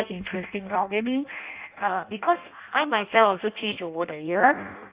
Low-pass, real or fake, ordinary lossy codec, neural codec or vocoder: 3.6 kHz; fake; Opus, 64 kbps; codec, 16 kHz in and 24 kHz out, 0.6 kbps, FireRedTTS-2 codec